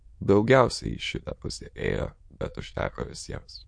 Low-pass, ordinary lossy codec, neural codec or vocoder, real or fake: 9.9 kHz; MP3, 48 kbps; autoencoder, 22.05 kHz, a latent of 192 numbers a frame, VITS, trained on many speakers; fake